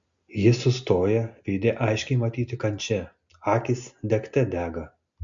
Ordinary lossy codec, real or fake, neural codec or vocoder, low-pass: MP3, 64 kbps; real; none; 7.2 kHz